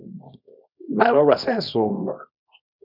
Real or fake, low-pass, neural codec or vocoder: fake; 5.4 kHz; codec, 24 kHz, 0.9 kbps, WavTokenizer, small release